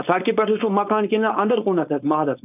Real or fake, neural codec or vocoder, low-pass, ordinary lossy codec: fake; codec, 16 kHz, 4.8 kbps, FACodec; 3.6 kHz; none